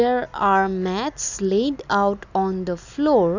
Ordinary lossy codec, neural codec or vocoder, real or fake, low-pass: none; none; real; 7.2 kHz